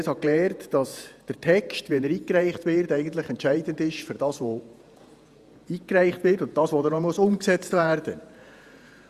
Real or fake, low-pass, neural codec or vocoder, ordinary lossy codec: fake; 14.4 kHz; vocoder, 48 kHz, 128 mel bands, Vocos; Opus, 64 kbps